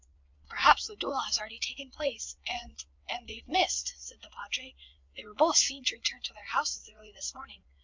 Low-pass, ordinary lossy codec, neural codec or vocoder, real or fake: 7.2 kHz; MP3, 64 kbps; vocoder, 22.05 kHz, 80 mel bands, WaveNeXt; fake